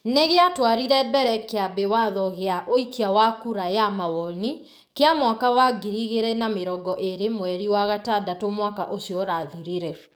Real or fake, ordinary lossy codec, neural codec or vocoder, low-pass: fake; none; codec, 44.1 kHz, 7.8 kbps, DAC; none